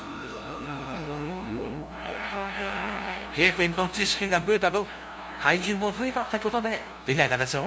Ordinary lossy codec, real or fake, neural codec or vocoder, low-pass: none; fake; codec, 16 kHz, 0.5 kbps, FunCodec, trained on LibriTTS, 25 frames a second; none